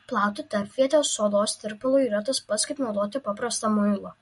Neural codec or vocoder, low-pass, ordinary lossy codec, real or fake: none; 14.4 kHz; MP3, 48 kbps; real